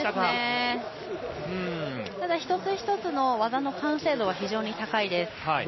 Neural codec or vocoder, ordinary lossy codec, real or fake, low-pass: none; MP3, 24 kbps; real; 7.2 kHz